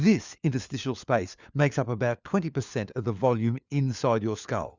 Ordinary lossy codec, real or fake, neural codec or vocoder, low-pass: Opus, 64 kbps; fake; codec, 16 kHz, 4 kbps, FunCodec, trained on LibriTTS, 50 frames a second; 7.2 kHz